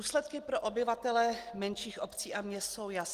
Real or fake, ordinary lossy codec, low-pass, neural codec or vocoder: real; Opus, 24 kbps; 14.4 kHz; none